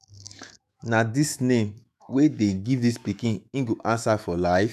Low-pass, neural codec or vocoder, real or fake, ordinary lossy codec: 14.4 kHz; autoencoder, 48 kHz, 128 numbers a frame, DAC-VAE, trained on Japanese speech; fake; none